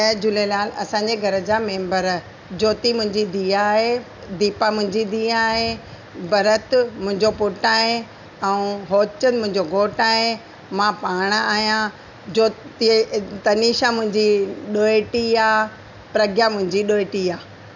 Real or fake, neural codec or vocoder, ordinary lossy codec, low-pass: real; none; none; 7.2 kHz